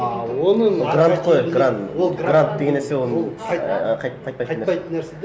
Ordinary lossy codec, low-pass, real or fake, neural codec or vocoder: none; none; real; none